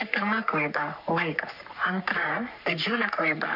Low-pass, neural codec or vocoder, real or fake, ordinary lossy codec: 5.4 kHz; codec, 44.1 kHz, 3.4 kbps, Pupu-Codec; fake; MP3, 48 kbps